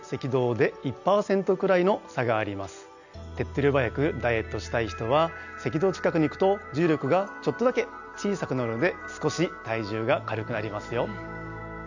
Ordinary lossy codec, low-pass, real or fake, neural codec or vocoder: none; 7.2 kHz; real; none